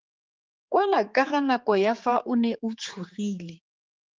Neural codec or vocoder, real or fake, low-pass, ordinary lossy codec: codec, 16 kHz, 4 kbps, X-Codec, HuBERT features, trained on general audio; fake; 7.2 kHz; Opus, 32 kbps